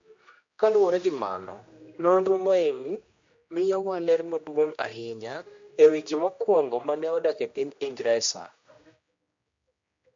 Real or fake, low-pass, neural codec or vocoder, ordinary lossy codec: fake; 7.2 kHz; codec, 16 kHz, 1 kbps, X-Codec, HuBERT features, trained on general audio; MP3, 48 kbps